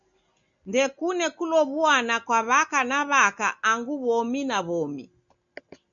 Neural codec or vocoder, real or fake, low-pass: none; real; 7.2 kHz